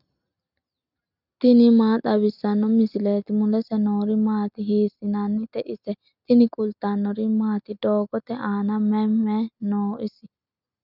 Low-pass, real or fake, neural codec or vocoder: 5.4 kHz; real; none